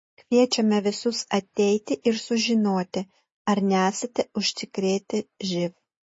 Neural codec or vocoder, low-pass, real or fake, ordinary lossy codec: none; 10.8 kHz; real; MP3, 32 kbps